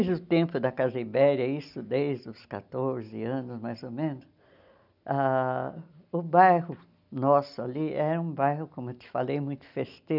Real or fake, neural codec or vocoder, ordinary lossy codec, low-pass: real; none; none; 5.4 kHz